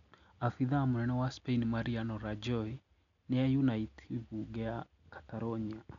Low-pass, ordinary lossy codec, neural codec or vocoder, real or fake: 7.2 kHz; none; none; real